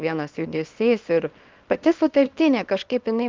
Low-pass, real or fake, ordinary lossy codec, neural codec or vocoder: 7.2 kHz; fake; Opus, 32 kbps; codec, 24 kHz, 0.9 kbps, WavTokenizer, small release